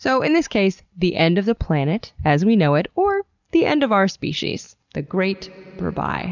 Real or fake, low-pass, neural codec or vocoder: real; 7.2 kHz; none